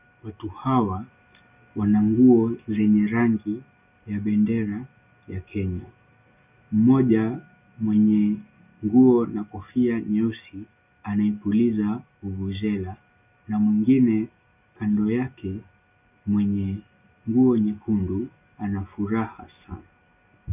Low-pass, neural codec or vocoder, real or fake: 3.6 kHz; none; real